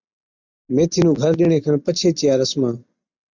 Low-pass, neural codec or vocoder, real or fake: 7.2 kHz; none; real